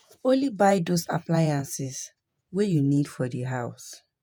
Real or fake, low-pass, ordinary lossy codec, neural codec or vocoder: fake; none; none; vocoder, 48 kHz, 128 mel bands, Vocos